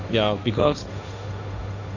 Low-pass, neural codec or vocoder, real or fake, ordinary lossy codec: 7.2 kHz; codec, 16 kHz, 1.1 kbps, Voila-Tokenizer; fake; none